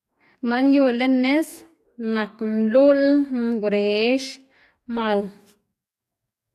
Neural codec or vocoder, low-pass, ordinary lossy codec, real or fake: codec, 44.1 kHz, 2.6 kbps, DAC; 14.4 kHz; AAC, 96 kbps; fake